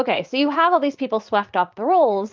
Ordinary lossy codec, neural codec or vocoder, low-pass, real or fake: Opus, 32 kbps; vocoder, 44.1 kHz, 80 mel bands, Vocos; 7.2 kHz; fake